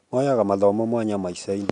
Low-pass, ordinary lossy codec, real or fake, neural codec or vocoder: 10.8 kHz; none; real; none